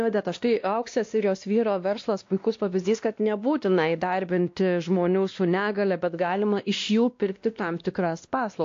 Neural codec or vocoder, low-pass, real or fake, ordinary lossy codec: codec, 16 kHz, 1 kbps, X-Codec, WavLM features, trained on Multilingual LibriSpeech; 7.2 kHz; fake; AAC, 48 kbps